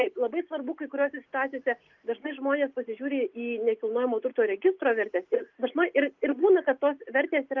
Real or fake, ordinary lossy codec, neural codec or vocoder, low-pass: real; Opus, 24 kbps; none; 7.2 kHz